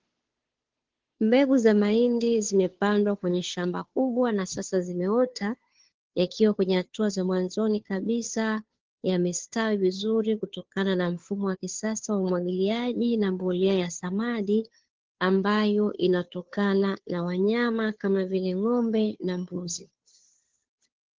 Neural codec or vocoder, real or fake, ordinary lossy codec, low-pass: codec, 16 kHz, 2 kbps, FunCodec, trained on Chinese and English, 25 frames a second; fake; Opus, 16 kbps; 7.2 kHz